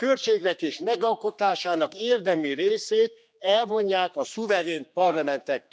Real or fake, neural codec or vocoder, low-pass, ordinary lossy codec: fake; codec, 16 kHz, 2 kbps, X-Codec, HuBERT features, trained on general audio; none; none